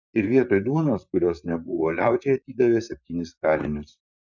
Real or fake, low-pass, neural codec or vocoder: fake; 7.2 kHz; vocoder, 44.1 kHz, 128 mel bands, Pupu-Vocoder